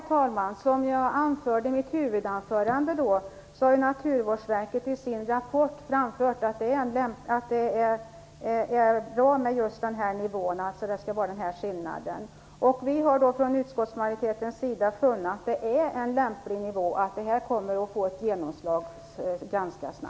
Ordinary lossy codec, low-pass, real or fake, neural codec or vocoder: none; none; real; none